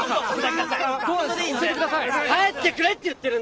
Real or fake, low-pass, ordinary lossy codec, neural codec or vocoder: real; none; none; none